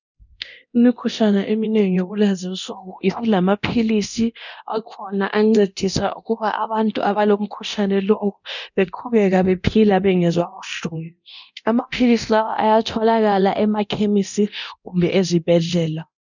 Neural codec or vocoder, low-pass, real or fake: codec, 24 kHz, 0.9 kbps, DualCodec; 7.2 kHz; fake